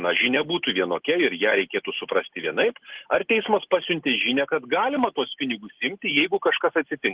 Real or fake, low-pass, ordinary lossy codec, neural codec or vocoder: real; 3.6 kHz; Opus, 16 kbps; none